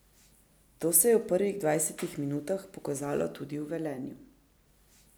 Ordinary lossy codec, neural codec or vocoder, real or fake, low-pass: none; none; real; none